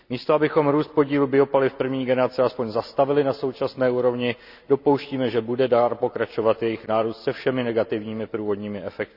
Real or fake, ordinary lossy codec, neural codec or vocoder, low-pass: real; none; none; 5.4 kHz